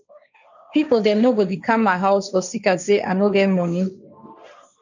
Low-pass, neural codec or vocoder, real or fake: 7.2 kHz; codec, 16 kHz, 1.1 kbps, Voila-Tokenizer; fake